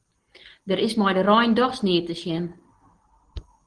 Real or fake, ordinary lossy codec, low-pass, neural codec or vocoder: real; Opus, 16 kbps; 9.9 kHz; none